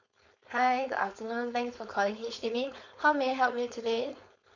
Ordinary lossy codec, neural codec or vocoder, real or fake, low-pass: none; codec, 16 kHz, 4.8 kbps, FACodec; fake; 7.2 kHz